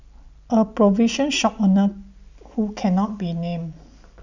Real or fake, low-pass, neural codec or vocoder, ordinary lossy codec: real; 7.2 kHz; none; none